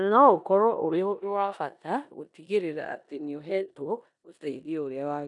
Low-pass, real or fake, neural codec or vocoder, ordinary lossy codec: 10.8 kHz; fake; codec, 16 kHz in and 24 kHz out, 0.9 kbps, LongCat-Audio-Codec, four codebook decoder; none